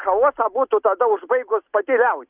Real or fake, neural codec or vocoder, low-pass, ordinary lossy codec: real; none; 3.6 kHz; Opus, 32 kbps